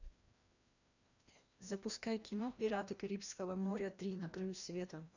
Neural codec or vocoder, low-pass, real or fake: codec, 16 kHz, 1 kbps, FreqCodec, larger model; 7.2 kHz; fake